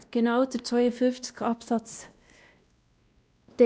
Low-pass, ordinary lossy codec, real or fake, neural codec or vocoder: none; none; fake; codec, 16 kHz, 1 kbps, X-Codec, WavLM features, trained on Multilingual LibriSpeech